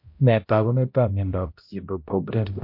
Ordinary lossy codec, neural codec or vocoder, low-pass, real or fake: MP3, 32 kbps; codec, 16 kHz, 0.5 kbps, X-Codec, HuBERT features, trained on balanced general audio; 5.4 kHz; fake